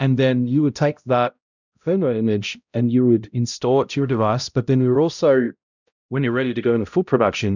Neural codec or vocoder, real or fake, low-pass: codec, 16 kHz, 0.5 kbps, X-Codec, HuBERT features, trained on balanced general audio; fake; 7.2 kHz